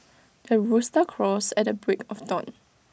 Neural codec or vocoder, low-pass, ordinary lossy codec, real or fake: none; none; none; real